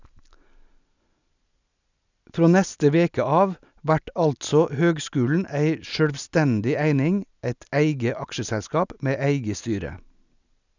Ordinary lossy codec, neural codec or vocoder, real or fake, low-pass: none; none; real; 7.2 kHz